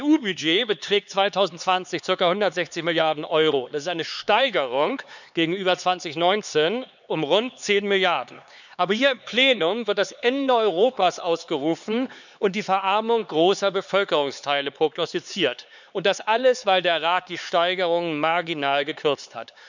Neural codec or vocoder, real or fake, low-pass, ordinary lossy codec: codec, 16 kHz, 4 kbps, X-Codec, HuBERT features, trained on LibriSpeech; fake; 7.2 kHz; none